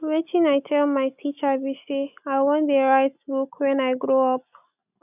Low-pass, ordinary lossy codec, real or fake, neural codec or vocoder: 3.6 kHz; none; real; none